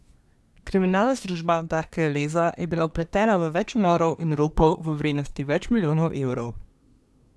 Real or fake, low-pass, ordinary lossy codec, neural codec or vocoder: fake; none; none; codec, 24 kHz, 1 kbps, SNAC